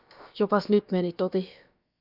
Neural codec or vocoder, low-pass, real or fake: codec, 16 kHz, about 1 kbps, DyCAST, with the encoder's durations; 5.4 kHz; fake